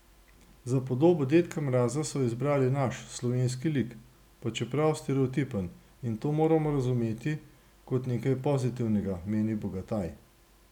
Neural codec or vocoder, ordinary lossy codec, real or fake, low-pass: none; none; real; 19.8 kHz